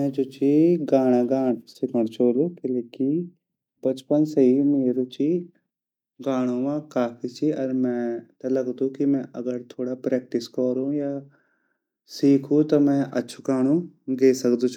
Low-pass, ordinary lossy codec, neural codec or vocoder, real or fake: 19.8 kHz; none; none; real